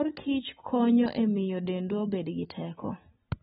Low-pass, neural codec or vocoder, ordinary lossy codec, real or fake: 19.8 kHz; none; AAC, 16 kbps; real